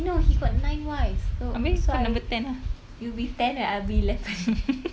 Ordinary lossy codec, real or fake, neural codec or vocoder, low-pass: none; real; none; none